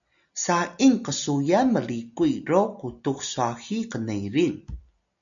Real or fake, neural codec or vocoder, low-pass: real; none; 7.2 kHz